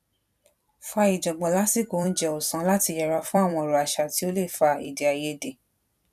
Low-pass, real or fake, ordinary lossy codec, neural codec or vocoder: 14.4 kHz; fake; none; vocoder, 44.1 kHz, 128 mel bands every 256 samples, BigVGAN v2